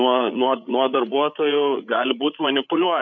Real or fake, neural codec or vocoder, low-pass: fake; codec, 16 kHz, 8 kbps, FreqCodec, larger model; 7.2 kHz